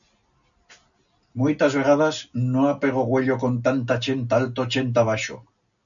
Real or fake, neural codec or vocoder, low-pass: real; none; 7.2 kHz